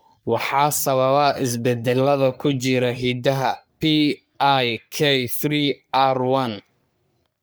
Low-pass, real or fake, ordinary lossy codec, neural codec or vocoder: none; fake; none; codec, 44.1 kHz, 3.4 kbps, Pupu-Codec